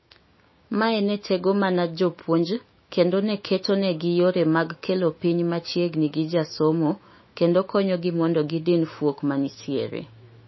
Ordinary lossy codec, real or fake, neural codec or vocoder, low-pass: MP3, 24 kbps; fake; autoencoder, 48 kHz, 128 numbers a frame, DAC-VAE, trained on Japanese speech; 7.2 kHz